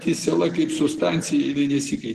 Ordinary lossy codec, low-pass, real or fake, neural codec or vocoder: Opus, 16 kbps; 14.4 kHz; real; none